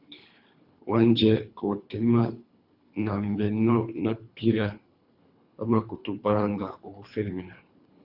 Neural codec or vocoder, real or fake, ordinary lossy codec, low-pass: codec, 24 kHz, 3 kbps, HILCodec; fake; Opus, 64 kbps; 5.4 kHz